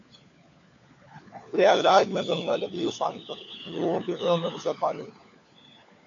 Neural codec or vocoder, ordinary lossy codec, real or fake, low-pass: codec, 16 kHz, 4 kbps, FunCodec, trained on LibriTTS, 50 frames a second; AAC, 64 kbps; fake; 7.2 kHz